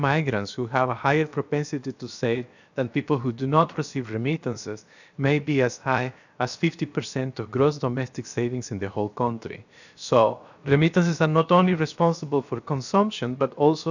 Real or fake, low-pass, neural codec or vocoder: fake; 7.2 kHz; codec, 16 kHz, about 1 kbps, DyCAST, with the encoder's durations